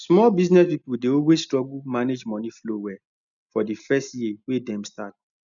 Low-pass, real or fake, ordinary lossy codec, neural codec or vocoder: 7.2 kHz; real; none; none